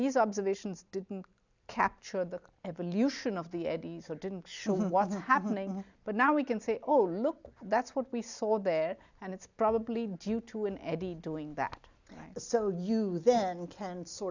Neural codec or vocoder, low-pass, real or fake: none; 7.2 kHz; real